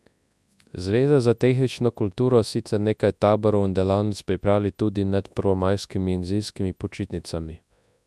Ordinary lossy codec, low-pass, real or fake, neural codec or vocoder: none; none; fake; codec, 24 kHz, 0.9 kbps, WavTokenizer, large speech release